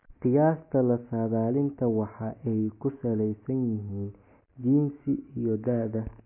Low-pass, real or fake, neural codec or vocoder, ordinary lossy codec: 3.6 kHz; real; none; MP3, 24 kbps